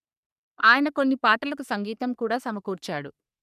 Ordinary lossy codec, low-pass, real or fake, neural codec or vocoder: none; 14.4 kHz; fake; codec, 44.1 kHz, 3.4 kbps, Pupu-Codec